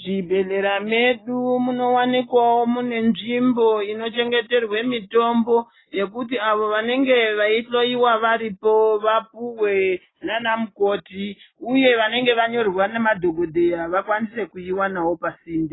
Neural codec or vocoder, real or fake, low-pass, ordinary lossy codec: none; real; 7.2 kHz; AAC, 16 kbps